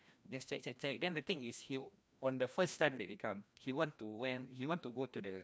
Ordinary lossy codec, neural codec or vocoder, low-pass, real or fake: none; codec, 16 kHz, 1 kbps, FreqCodec, larger model; none; fake